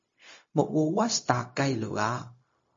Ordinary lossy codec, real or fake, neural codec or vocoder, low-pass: MP3, 32 kbps; fake; codec, 16 kHz, 0.4 kbps, LongCat-Audio-Codec; 7.2 kHz